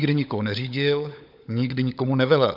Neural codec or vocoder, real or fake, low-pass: codec, 16 kHz, 16 kbps, FunCodec, trained on LibriTTS, 50 frames a second; fake; 5.4 kHz